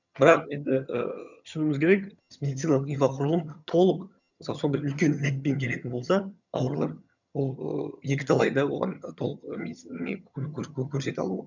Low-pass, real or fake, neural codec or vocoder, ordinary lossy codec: 7.2 kHz; fake; vocoder, 22.05 kHz, 80 mel bands, HiFi-GAN; none